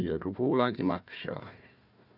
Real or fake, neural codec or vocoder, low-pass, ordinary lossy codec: fake; codec, 16 kHz, 1 kbps, FunCodec, trained on Chinese and English, 50 frames a second; 5.4 kHz; none